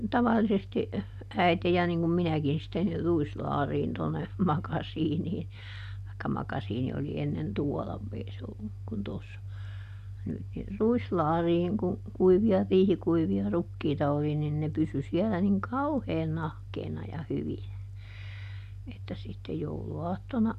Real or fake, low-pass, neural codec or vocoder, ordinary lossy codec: real; 14.4 kHz; none; none